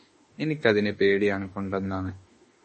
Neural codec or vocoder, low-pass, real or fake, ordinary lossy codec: autoencoder, 48 kHz, 32 numbers a frame, DAC-VAE, trained on Japanese speech; 10.8 kHz; fake; MP3, 32 kbps